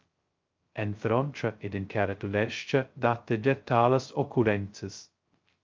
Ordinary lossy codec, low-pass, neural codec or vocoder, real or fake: Opus, 24 kbps; 7.2 kHz; codec, 16 kHz, 0.2 kbps, FocalCodec; fake